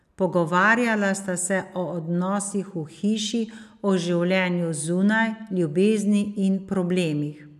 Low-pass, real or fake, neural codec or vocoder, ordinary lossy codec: 14.4 kHz; real; none; none